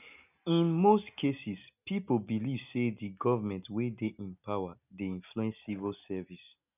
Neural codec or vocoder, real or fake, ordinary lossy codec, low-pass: none; real; none; 3.6 kHz